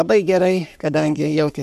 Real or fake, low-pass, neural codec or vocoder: fake; 14.4 kHz; codec, 44.1 kHz, 3.4 kbps, Pupu-Codec